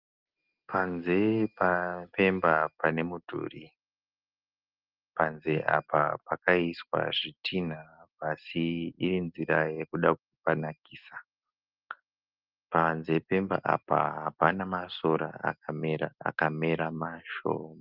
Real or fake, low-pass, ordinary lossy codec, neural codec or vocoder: real; 5.4 kHz; Opus, 32 kbps; none